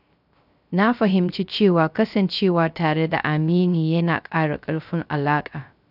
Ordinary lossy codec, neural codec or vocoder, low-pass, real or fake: none; codec, 16 kHz, 0.3 kbps, FocalCodec; 5.4 kHz; fake